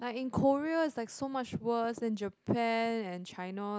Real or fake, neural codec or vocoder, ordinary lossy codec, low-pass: real; none; none; none